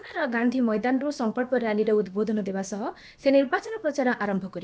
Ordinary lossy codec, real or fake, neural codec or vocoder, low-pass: none; fake; codec, 16 kHz, about 1 kbps, DyCAST, with the encoder's durations; none